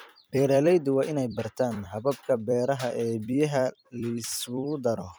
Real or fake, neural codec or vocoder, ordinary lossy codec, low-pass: fake; vocoder, 44.1 kHz, 128 mel bands every 256 samples, BigVGAN v2; none; none